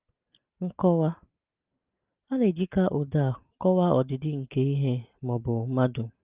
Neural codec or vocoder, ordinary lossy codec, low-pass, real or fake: none; Opus, 24 kbps; 3.6 kHz; real